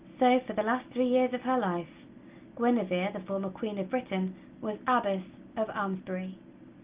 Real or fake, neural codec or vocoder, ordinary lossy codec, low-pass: real; none; Opus, 32 kbps; 3.6 kHz